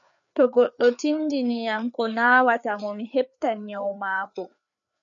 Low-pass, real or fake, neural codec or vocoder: 7.2 kHz; fake; codec, 16 kHz, 4 kbps, FreqCodec, larger model